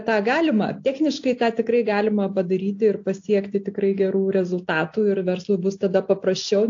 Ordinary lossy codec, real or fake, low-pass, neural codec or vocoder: AAC, 48 kbps; real; 7.2 kHz; none